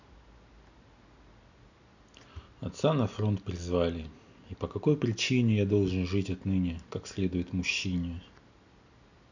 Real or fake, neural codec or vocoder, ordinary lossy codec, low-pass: real; none; none; 7.2 kHz